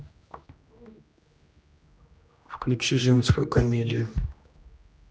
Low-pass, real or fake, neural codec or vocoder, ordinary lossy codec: none; fake; codec, 16 kHz, 1 kbps, X-Codec, HuBERT features, trained on general audio; none